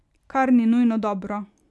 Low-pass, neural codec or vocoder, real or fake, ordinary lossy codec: none; none; real; none